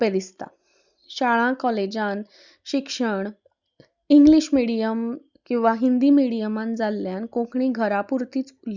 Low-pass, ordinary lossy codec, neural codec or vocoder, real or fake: 7.2 kHz; Opus, 64 kbps; none; real